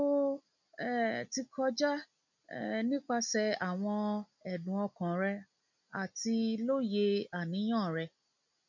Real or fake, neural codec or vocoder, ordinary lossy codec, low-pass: real; none; none; 7.2 kHz